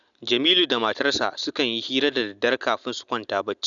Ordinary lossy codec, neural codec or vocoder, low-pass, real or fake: none; none; 7.2 kHz; real